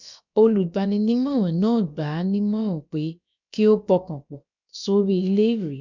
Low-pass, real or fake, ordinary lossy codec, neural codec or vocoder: 7.2 kHz; fake; none; codec, 16 kHz, about 1 kbps, DyCAST, with the encoder's durations